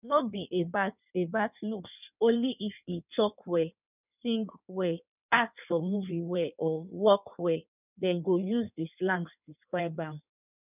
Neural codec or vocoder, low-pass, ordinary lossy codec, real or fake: codec, 16 kHz in and 24 kHz out, 1.1 kbps, FireRedTTS-2 codec; 3.6 kHz; none; fake